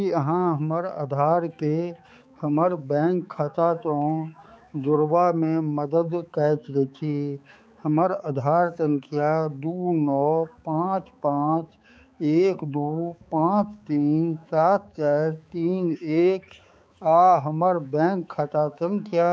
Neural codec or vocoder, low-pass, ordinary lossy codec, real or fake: codec, 16 kHz, 4 kbps, X-Codec, HuBERT features, trained on balanced general audio; none; none; fake